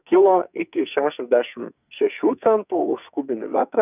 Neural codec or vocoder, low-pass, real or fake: codec, 44.1 kHz, 2.6 kbps, SNAC; 3.6 kHz; fake